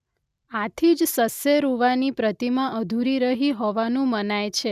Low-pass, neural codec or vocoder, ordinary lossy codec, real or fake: 14.4 kHz; none; none; real